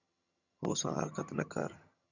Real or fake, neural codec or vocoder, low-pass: fake; vocoder, 22.05 kHz, 80 mel bands, HiFi-GAN; 7.2 kHz